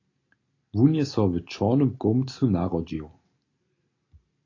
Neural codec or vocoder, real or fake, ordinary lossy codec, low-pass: none; real; AAC, 32 kbps; 7.2 kHz